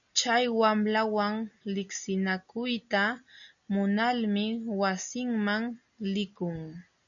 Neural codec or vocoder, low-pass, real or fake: none; 7.2 kHz; real